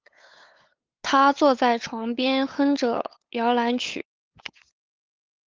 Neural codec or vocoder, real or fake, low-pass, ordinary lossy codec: codec, 16 kHz, 8 kbps, FunCodec, trained on LibriTTS, 25 frames a second; fake; 7.2 kHz; Opus, 24 kbps